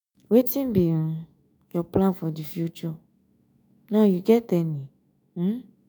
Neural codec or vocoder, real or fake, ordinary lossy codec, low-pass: autoencoder, 48 kHz, 128 numbers a frame, DAC-VAE, trained on Japanese speech; fake; none; none